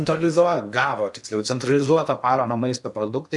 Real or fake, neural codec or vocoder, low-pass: fake; codec, 16 kHz in and 24 kHz out, 0.8 kbps, FocalCodec, streaming, 65536 codes; 10.8 kHz